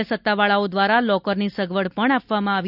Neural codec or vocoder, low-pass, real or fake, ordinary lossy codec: none; 5.4 kHz; real; none